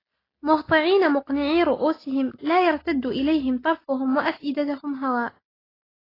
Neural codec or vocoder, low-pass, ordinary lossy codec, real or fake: none; 5.4 kHz; AAC, 24 kbps; real